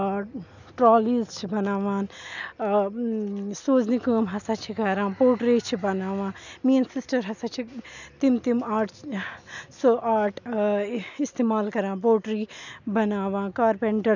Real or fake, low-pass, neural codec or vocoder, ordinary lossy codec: real; 7.2 kHz; none; none